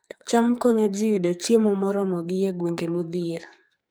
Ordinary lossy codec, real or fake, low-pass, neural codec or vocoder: none; fake; none; codec, 44.1 kHz, 2.6 kbps, SNAC